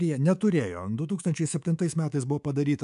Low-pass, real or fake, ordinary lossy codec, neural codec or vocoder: 10.8 kHz; fake; AAC, 96 kbps; codec, 24 kHz, 3.1 kbps, DualCodec